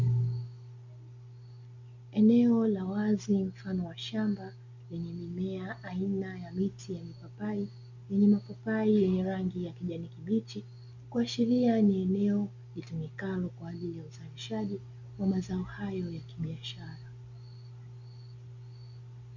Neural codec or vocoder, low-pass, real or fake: none; 7.2 kHz; real